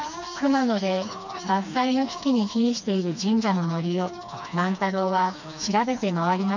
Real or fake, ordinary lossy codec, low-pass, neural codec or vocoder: fake; none; 7.2 kHz; codec, 16 kHz, 2 kbps, FreqCodec, smaller model